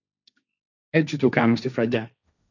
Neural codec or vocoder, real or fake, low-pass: codec, 16 kHz, 1.1 kbps, Voila-Tokenizer; fake; 7.2 kHz